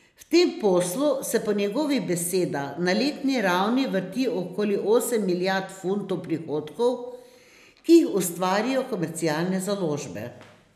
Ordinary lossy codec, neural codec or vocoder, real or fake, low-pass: none; none; real; 14.4 kHz